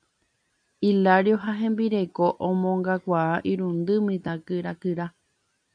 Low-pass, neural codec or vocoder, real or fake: 9.9 kHz; none; real